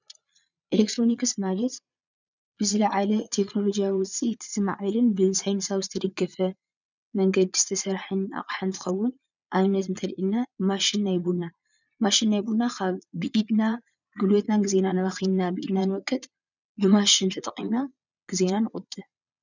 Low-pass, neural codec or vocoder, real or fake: 7.2 kHz; vocoder, 22.05 kHz, 80 mel bands, Vocos; fake